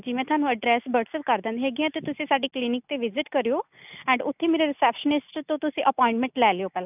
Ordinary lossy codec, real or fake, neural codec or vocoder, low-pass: none; real; none; 3.6 kHz